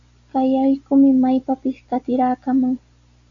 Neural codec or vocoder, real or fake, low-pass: none; real; 7.2 kHz